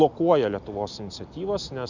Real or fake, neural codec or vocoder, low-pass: real; none; 7.2 kHz